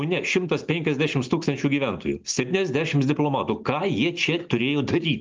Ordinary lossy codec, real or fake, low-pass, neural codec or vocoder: Opus, 24 kbps; real; 7.2 kHz; none